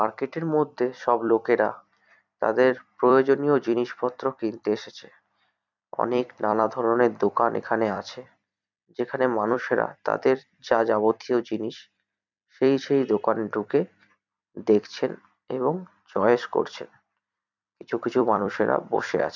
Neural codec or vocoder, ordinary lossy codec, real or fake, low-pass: vocoder, 44.1 kHz, 128 mel bands every 256 samples, BigVGAN v2; none; fake; 7.2 kHz